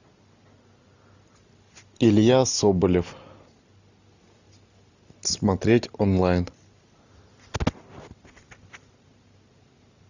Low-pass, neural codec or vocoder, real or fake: 7.2 kHz; none; real